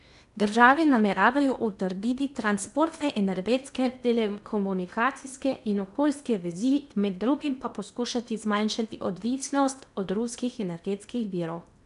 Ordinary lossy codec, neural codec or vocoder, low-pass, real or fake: none; codec, 16 kHz in and 24 kHz out, 0.8 kbps, FocalCodec, streaming, 65536 codes; 10.8 kHz; fake